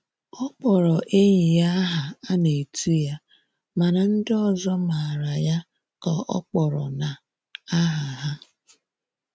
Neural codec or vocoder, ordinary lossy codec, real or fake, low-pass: none; none; real; none